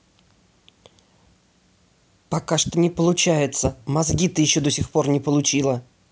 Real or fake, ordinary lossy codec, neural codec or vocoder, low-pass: real; none; none; none